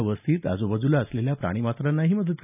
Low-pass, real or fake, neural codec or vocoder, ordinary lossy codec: 3.6 kHz; real; none; none